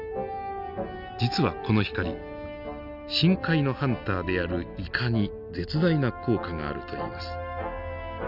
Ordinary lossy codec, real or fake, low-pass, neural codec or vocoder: none; real; 5.4 kHz; none